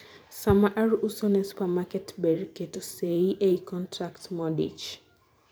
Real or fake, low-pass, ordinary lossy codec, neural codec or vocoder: fake; none; none; vocoder, 44.1 kHz, 128 mel bands every 512 samples, BigVGAN v2